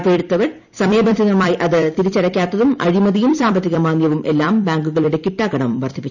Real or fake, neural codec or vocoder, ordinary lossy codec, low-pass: fake; vocoder, 44.1 kHz, 128 mel bands every 256 samples, BigVGAN v2; none; 7.2 kHz